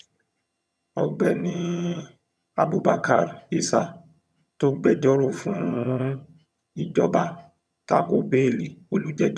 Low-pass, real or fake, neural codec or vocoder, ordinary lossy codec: none; fake; vocoder, 22.05 kHz, 80 mel bands, HiFi-GAN; none